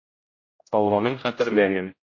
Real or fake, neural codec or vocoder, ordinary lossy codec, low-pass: fake; codec, 16 kHz, 0.5 kbps, X-Codec, HuBERT features, trained on general audio; MP3, 32 kbps; 7.2 kHz